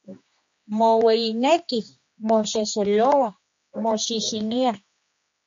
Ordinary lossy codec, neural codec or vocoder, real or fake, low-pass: MP3, 48 kbps; codec, 16 kHz, 2 kbps, X-Codec, HuBERT features, trained on general audio; fake; 7.2 kHz